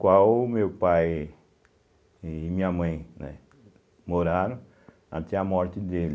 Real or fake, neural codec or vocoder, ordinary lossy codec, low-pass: real; none; none; none